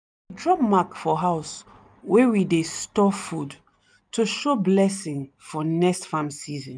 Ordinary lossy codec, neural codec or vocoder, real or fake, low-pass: none; none; real; 9.9 kHz